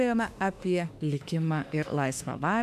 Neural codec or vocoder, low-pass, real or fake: autoencoder, 48 kHz, 32 numbers a frame, DAC-VAE, trained on Japanese speech; 14.4 kHz; fake